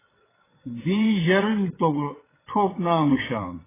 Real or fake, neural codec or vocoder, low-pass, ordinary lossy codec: fake; vocoder, 22.05 kHz, 80 mel bands, WaveNeXt; 3.6 kHz; AAC, 16 kbps